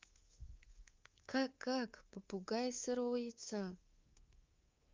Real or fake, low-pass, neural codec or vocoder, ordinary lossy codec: fake; 7.2 kHz; codec, 24 kHz, 3.1 kbps, DualCodec; Opus, 24 kbps